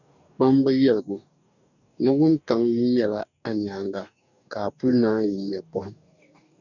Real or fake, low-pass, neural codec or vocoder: fake; 7.2 kHz; codec, 44.1 kHz, 2.6 kbps, DAC